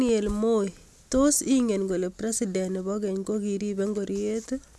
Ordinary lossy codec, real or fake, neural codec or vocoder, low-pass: none; real; none; none